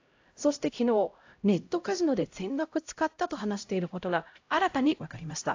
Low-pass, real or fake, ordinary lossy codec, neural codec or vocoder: 7.2 kHz; fake; AAC, 48 kbps; codec, 16 kHz, 0.5 kbps, X-Codec, HuBERT features, trained on LibriSpeech